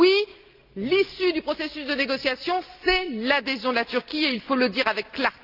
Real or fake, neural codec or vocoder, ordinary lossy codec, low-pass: real; none; Opus, 32 kbps; 5.4 kHz